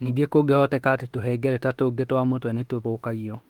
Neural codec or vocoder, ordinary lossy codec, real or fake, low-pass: autoencoder, 48 kHz, 32 numbers a frame, DAC-VAE, trained on Japanese speech; Opus, 24 kbps; fake; 19.8 kHz